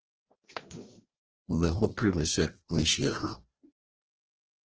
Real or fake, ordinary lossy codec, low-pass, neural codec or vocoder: fake; Opus, 16 kbps; 7.2 kHz; codec, 16 kHz, 1 kbps, FreqCodec, larger model